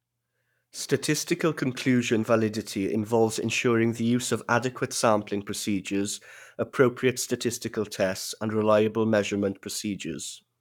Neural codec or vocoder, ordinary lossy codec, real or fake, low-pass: codec, 44.1 kHz, 7.8 kbps, Pupu-Codec; none; fake; 19.8 kHz